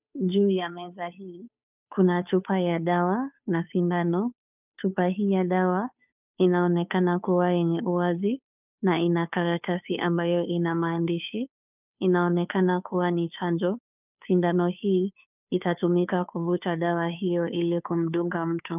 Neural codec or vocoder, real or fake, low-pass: codec, 16 kHz, 2 kbps, FunCodec, trained on Chinese and English, 25 frames a second; fake; 3.6 kHz